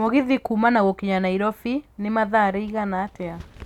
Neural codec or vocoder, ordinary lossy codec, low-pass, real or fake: none; none; 19.8 kHz; real